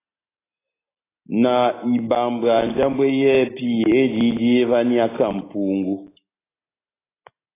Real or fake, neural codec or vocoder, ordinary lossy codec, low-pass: real; none; AAC, 16 kbps; 3.6 kHz